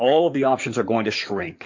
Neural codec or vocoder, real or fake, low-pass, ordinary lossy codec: codec, 16 kHz in and 24 kHz out, 2.2 kbps, FireRedTTS-2 codec; fake; 7.2 kHz; MP3, 48 kbps